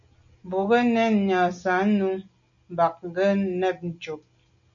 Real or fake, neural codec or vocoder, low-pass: real; none; 7.2 kHz